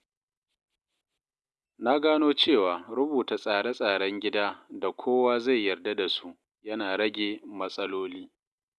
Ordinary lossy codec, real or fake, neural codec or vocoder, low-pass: none; real; none; none